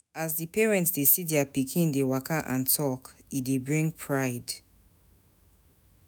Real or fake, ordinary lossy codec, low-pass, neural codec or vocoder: fake; none; none; autoencoder, 48 kHz, 128 numbers a frame, DAC-VAE, trained on Japanese speech